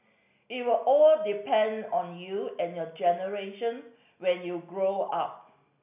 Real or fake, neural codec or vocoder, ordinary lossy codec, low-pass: real; none; none; 3.6 kHz